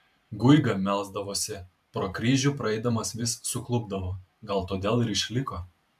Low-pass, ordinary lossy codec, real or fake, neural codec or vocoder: 14.4 kHz; AAC, 96 kbps; fake; vocoder, 44.1 kHz, 128 mel bands every 512 samples, BigVGAN v2